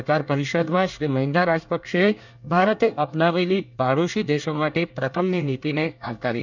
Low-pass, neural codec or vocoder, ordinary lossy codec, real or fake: 7.2 kHz; codec, 24 kHz, 1 kbps, SNAC; none; fake